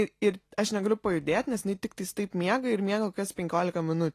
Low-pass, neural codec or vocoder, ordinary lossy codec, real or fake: 14.4 kHz; none; AAC, 48 kbps; real